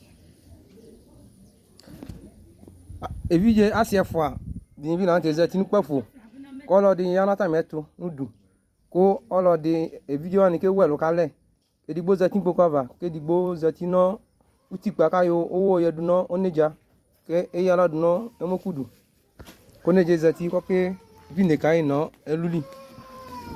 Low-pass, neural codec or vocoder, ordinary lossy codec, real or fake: 14.4 kHz; vocoder, 44.1 kHz, 128 mel bands every 256 samples, BigVGAN v2; Opus, 64 kbps; fake